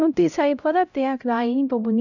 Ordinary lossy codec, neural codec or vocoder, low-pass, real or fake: none; codec, 16 kHz, 0.5 kbps, X-Codec, HuBERT features, trained on LibriSpeech; 7.2 kHz; fake